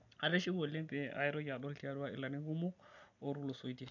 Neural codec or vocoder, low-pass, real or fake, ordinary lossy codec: none; 7.2 kHz; real; none